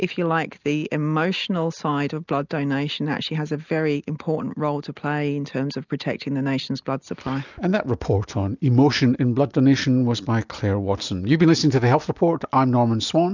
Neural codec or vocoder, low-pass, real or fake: none; 7.2 kHz; real